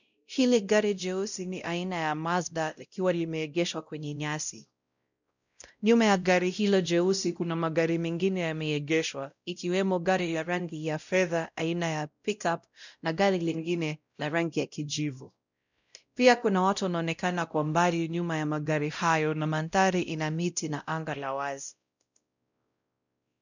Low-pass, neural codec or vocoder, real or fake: 7.2 kHz; codec, 16 kHz, 0.5 kbps, X-Codec, WavLM features, trained on Multilingual LibriSpeech; fake